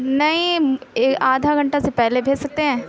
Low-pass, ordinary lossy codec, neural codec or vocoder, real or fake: none; none; none; real